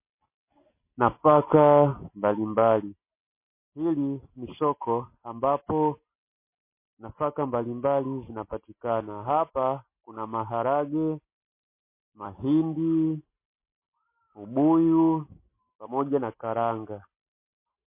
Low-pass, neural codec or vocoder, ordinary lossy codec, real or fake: 3.6 kHz; none; MP3, 24 kbps; real